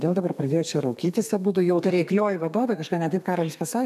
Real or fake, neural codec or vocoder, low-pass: fake; codec, 32 kHz, 1.9 kbps, SNAC; 14.4 kHz